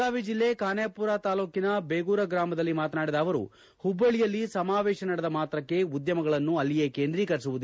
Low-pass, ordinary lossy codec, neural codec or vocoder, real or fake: none; none; none; real